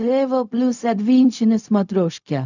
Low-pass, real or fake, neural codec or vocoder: 7.2 kHz; fake; codec, 16 kHz, 0.4 kbps, LongCat-Audio-Codec